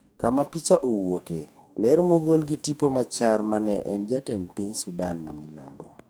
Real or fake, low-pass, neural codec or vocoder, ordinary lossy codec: fake; none; codec, 44.1 kHz, 2.6 kbps, DAC; none